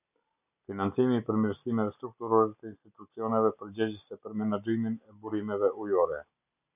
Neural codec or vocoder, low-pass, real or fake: none; 3.6 kHz; real